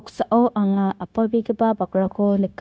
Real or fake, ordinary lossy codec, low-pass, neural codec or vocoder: fake; none; none; codec, 16 kHz, 0.9 kbps, LongCat-Audio-Codec